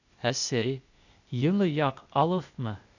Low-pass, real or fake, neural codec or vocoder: 7.2 kHz; fake; codec, 16 kHz, 0.8 kbps, ZipCodec